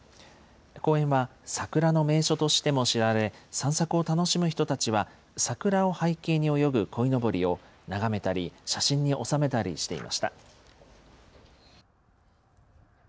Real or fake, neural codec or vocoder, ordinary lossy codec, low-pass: real; none; none; none